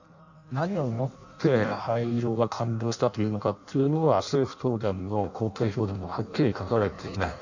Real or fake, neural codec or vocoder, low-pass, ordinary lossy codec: fake; codec, 16 kHz in and 24 kHz out, 0.6 kbps, FireRedTTS-2 codec; 7.2 kHz; none